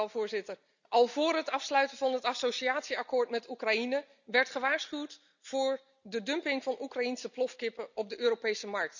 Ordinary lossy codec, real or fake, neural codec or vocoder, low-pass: none; real; none; 7.2 kHz